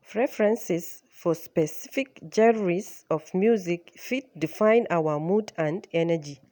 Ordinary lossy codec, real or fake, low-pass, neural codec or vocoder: none; real; none; none